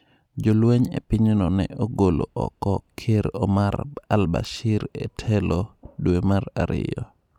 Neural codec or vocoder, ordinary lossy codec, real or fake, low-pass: none; none; real; 19.8 kHz